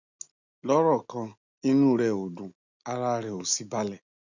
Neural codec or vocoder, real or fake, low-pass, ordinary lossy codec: none; real; 7.2 kHz; none